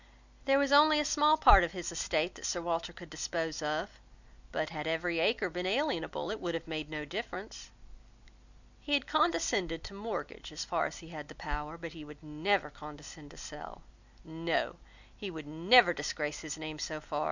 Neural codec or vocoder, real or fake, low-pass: none; real; 7.2 kHz